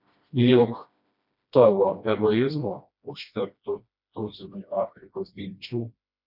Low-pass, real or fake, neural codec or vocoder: 5.4 kHz; fake; codec, 16 kHz, 1 kbps, FreqCodec, smaller model